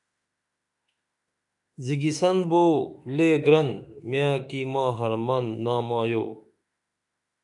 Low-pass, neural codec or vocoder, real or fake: 10.8 kHz; autoencoder, 48 kHz, 32 numbers a frame, DAC-VAE, trained on Japanese speech; fake